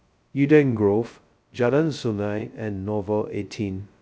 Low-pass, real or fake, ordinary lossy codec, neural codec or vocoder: none; fake; none; codec, 16 kHz, 0.2 kbps, FocalCodec